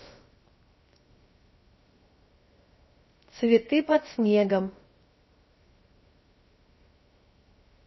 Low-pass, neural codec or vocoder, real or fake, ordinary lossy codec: 7.2 kHz; codec, 16 kHz, 0.7 kbps, FocalCodec; fake; MP3, 24 kbps